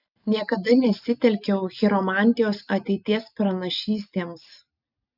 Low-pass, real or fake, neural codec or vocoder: 5.4 kHz; real; none